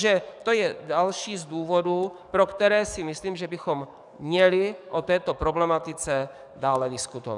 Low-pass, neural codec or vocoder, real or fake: 10.8 kHz; codec, 44.1 kHz, 7.8 kbps, DAC; fake